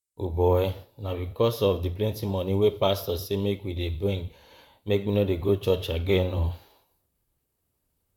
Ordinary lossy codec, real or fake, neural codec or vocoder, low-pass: none; fake; vocoder, 44.1 kHz, 128 mel bands, Pupu-Vocoder; 19.8 kHz